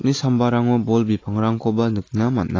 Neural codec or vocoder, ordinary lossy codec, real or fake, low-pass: none; AAC, 32 kbps; real; 7.2 kHz